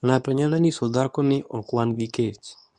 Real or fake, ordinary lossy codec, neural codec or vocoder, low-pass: fake; none; codec, 24 kHz, 0.9 kbps, WavTokenizer, medium speech release version 2; 10.8 kHz